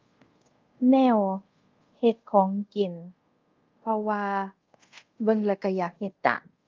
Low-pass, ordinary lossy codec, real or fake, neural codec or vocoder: 7.2 kHz; Opus, 32 kbps; fake; codec, 24 kHz, 0.5 kbps, DualCodec